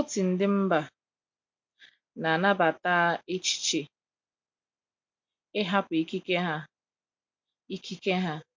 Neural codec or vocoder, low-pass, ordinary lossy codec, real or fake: none; 7.2 kHz; MP3, 48 kbps; real